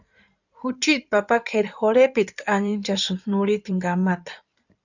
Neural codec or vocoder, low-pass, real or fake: codec, 16 kHz in and 24 kHz out, 2.2 kbps, FireRedTTS-2 codec; 7.2 kHz; fake